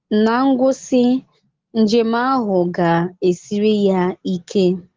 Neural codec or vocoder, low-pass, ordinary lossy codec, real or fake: none; 7.2 kHz; Opus, 16 kbps; real